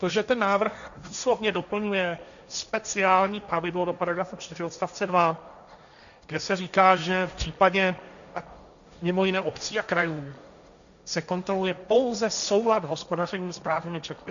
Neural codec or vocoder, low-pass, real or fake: codec, 16 kHz, 1.1 kbps, Voila-Tokenizer; 7.2 kHz; fake